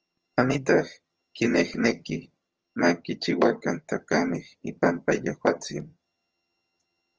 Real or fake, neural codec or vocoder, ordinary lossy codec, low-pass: fake; vocoder, 22.05 kHz, 80 mel bands, HiFi-GAN; Opus, 24 kbps; 7.2 kHz